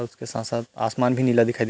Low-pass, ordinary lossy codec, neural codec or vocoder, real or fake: none; none; none; real